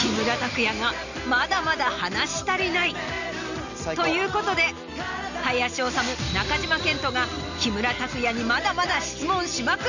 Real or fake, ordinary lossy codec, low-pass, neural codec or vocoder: real; none; 7.2 kHz; none